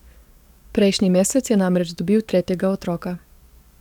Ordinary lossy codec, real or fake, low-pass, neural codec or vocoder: none; fake; 19.8 kHz; codec, 44.1 kHz, 7.8 kbps, DAC